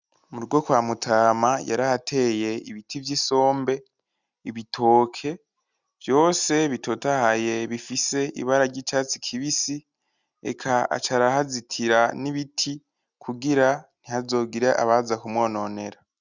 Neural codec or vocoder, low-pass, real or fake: none; 7.2 kHz; real